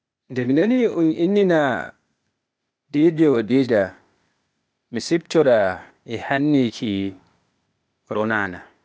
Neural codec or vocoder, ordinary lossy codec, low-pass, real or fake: codec, 16 kHz, 0.8 kbps, ZipCodec; none; none; fake